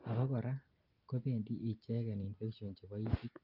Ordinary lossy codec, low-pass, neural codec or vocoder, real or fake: Opus, 24 kbps; 5.4 kHz; none; real